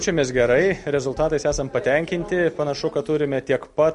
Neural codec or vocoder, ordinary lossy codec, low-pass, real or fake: none; MP3, 48 kbps; 10.8 kHz; real